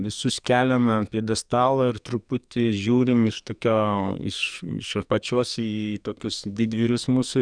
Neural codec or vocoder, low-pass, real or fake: codec, 44.1 kHz, 2.6 kbps, SNAC; 9.9 kHz; fake